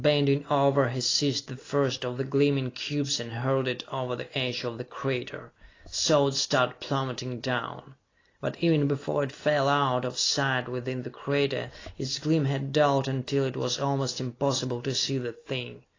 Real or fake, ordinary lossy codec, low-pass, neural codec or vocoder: real; AAC, 32 kbps; 7.2 kHz; none